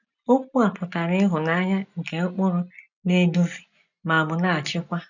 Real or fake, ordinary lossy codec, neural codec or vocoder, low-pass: real; none; none; 7.2 kHz